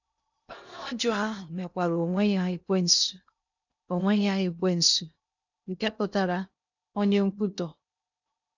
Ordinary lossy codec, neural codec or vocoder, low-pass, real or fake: none; codec, 16 kHz in and 24 kHz out, 0.6 kbps, FocalCodec, streaming, 2048 codes; 7.2 kHz; fake